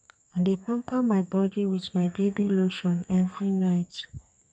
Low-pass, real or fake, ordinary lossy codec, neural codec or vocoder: 9.9 kHz; fake; none; codec, 32 kHz, 1.9 kbps, SNAC